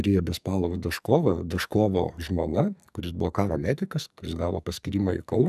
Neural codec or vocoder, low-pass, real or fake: codec, 44.1 kHz, 2.6 kbps, SNAC; 14.4 kHz; fake